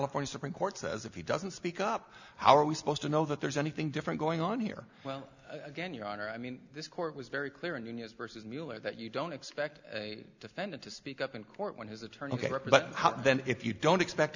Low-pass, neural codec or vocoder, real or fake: 7.2 kHz; none; real